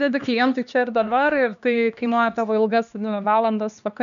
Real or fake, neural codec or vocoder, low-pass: fake; codec, 16 kHz, 2 kbps, X-Codec, HuBERT features, trained on LibriSpeech; 7.2 kHz